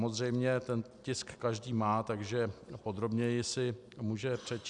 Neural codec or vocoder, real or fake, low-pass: none; real; 10.8 kHz